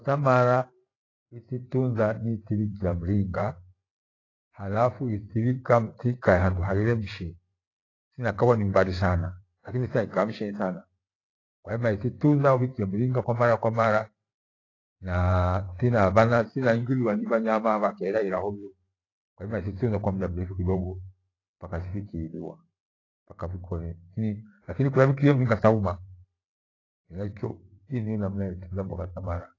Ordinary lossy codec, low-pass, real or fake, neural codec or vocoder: AAC, 32 kbps; 7.2 kHz; fake; vocoder, 44.1 kHz, 128 mel bands every 512 samples, BigVGAN v2